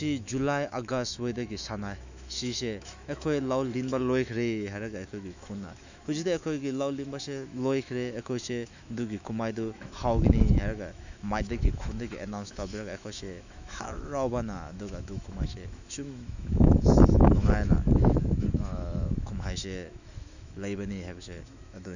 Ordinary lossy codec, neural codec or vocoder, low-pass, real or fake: none; autoencoder, 48 kHz, 128 numbers a frame, DAC-VAE, trained on Japanese speech; 7.2 kHz; fake